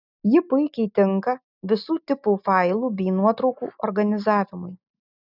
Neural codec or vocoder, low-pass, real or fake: none; 5.4 kHz; real